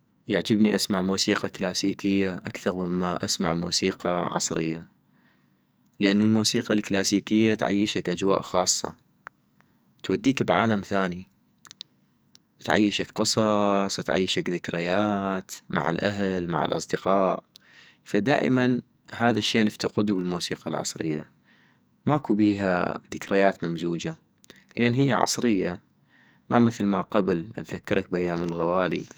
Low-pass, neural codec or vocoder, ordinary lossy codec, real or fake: none; codec, 44.1 kHz, 2.6 kbps, SNAC; none; fake